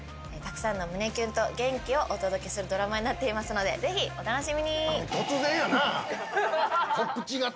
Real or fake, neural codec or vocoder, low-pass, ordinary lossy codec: real; none; none; none